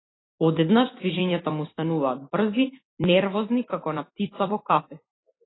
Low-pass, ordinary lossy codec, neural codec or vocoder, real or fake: 7.2 kHz; AAC, 16 kbps; vocoder, 44.1 kHz, 128 mel bands every 256 samples, BigVGAN v2; fake